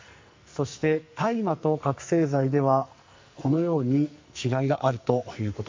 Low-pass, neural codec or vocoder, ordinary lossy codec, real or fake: 7.2 kHz; codec, 44.1 kHz, 2.6 kbps, SNAC; MP3, 48 kbps; fake